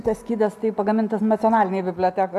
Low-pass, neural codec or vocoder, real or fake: 14.4 kHz; none; real